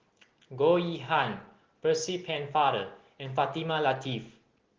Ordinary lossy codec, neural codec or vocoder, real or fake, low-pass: Opus, 16 kbps; none; real; 7.2 kHz